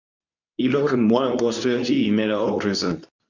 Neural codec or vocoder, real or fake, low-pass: codec, 24 kHz, 0.9 kbps, WavTokenizer, medium speech release version 2; fake; 7.2 kHz